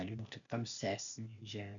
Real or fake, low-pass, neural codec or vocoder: fake; 7.2 kHz; codec, 16 kHz, 0.8 kbps, ZipCodec